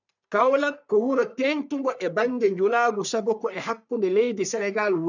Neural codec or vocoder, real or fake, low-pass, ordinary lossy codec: codec, 44.1 kHz, 3.4 kbps, Pupu-Codec; fake; 7.2 kHz; none